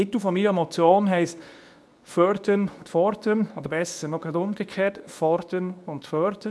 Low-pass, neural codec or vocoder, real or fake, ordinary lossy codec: none; codec, 24 kHz, 0.9 kbps, WavTokenizer, medium speech release version 2; fake; none